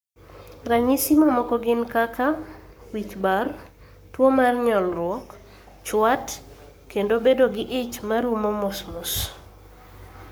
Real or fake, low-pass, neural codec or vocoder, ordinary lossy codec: fake; none; codec, 44.1 kHz, 7.8 kbps, Pupu-Codec; none